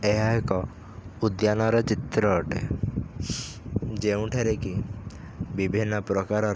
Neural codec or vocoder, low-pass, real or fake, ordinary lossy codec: none; none; real; none